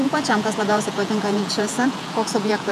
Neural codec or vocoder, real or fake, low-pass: vocoder, 48 kHz, 128 mel bands, Vocos; fake; 14.4 kHz